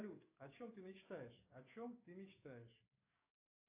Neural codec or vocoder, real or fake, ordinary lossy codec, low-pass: codec, 44.1 kHz, 7.8 kbps, DAC; fake; AAC, 24 kbps; 3.6 kHz